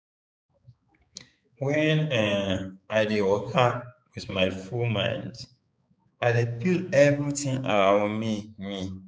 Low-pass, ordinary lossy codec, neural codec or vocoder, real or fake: none; none; codec, 16 kHz, 4 kbps, X-Codec, HuBERT features, trained on balanced general audio; fake